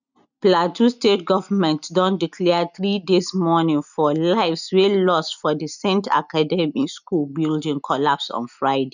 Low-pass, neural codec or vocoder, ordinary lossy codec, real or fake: 7.2 kHz; none; none; real